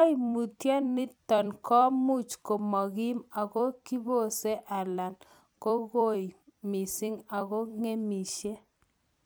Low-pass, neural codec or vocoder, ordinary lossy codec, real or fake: none; vocoder, 44.1 kHz, 128 mel bands every 256 samples, BigVGAN v2; none; fake